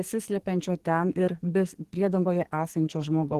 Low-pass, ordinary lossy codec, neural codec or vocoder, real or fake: 14.4 kHz; Opus, 16 kbps; codec, 44.1 kHz, 2.6 kbps, SNAC; fake